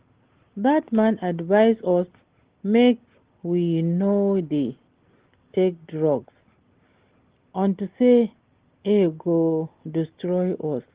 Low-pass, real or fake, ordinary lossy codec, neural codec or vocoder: 3.6 kHz; real; Opus, 16 kbps; none